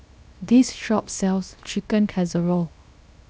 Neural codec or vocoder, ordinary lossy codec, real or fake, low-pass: codec, 16 kHz, 0.7 kbps, FocalCodec; none; fake; none